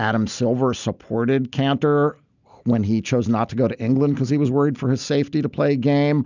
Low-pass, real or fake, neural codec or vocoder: 7.2 kHz; real; none